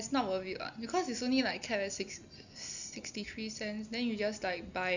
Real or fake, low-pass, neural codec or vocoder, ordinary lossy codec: real; 7.2 kHz; none; none